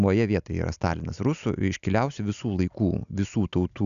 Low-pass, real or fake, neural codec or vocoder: 7.2 kHz; real; none